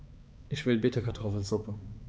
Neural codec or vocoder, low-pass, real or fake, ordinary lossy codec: codec, 16 kHz, 4 kbps, X-Codec, HuBERT features, trained on balanced general audio; none; fake; none